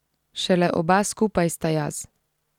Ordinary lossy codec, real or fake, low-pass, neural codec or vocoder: none; real; 19.8 kHz; none